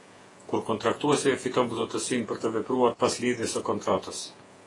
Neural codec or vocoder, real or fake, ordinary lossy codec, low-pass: vocoder, 48 kHz, 128 mel bands, Vocos; fake; AAC, 32 kbps; 10.8 kHz